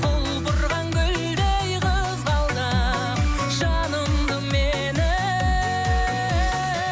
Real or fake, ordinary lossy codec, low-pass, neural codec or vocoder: real; none; none; none